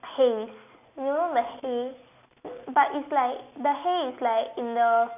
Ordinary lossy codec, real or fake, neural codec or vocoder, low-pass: none; real; none; 3.6 kHz